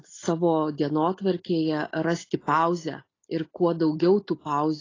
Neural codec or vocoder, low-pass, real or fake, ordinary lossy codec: none; 7.2 kHz; real; AAC, 32 kbps